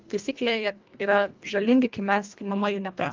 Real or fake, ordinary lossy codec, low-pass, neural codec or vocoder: fake; Opus, 32 kbps; 7.2 kHz; codec, 24 kHz, 1.5 kbps, HILCodec